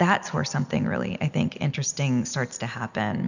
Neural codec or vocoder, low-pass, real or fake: none; 7.2 kHz; real